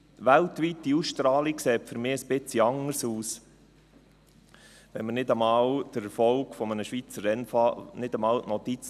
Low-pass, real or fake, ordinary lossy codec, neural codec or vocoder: 14.4 kHz; real; none; none